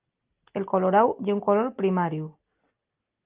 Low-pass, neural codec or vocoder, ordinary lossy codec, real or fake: 3.6 kHz; none; Opus, 24 kbps; real